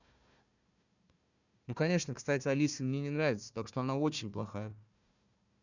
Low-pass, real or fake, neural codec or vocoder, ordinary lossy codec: 7.2 kHz; fake; codec, 16 kHz, 1 kbps, FunCodec, trained on Chinese and English, 50 frames a second; none